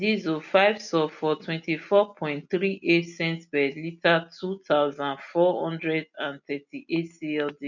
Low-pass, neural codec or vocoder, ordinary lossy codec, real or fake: 7.2 kHz; none; none; real